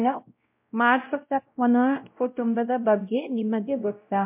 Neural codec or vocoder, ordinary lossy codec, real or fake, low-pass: codec, 16 kHz, 0.5 kbps, X-Codec, WavLM features, trained on Multilingual LibriSpeech; MP3, 32 kbps; fake; 3.6 kHz